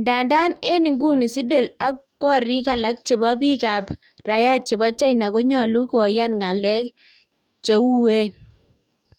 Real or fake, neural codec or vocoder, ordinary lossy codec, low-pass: fake; codec, 44.1 kHz, 2.6 kbps, DAC; none; 19.8 kHz